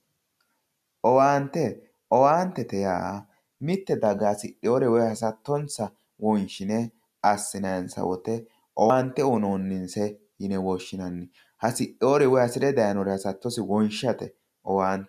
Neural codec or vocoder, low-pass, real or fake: none; 14.4 kHz; real